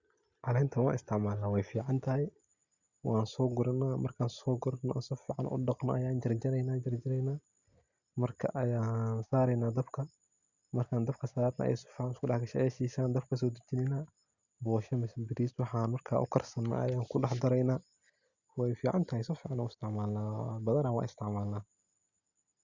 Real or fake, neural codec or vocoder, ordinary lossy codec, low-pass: real; none; Opus, 64 kbps; 7.2 kHz